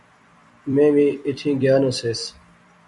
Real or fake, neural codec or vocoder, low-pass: fake; vocoder, 44.1 kHz, 128 mel bands every 256 samples, BigVGAN v2; 10.8 kHz